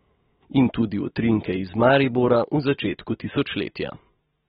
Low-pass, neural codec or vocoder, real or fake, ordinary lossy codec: 10.8 kHz; none; real; AAC, 16 kbps